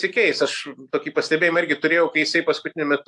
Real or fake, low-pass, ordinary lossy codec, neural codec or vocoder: real; 10.8 kHz; AAC, 64 kbps; none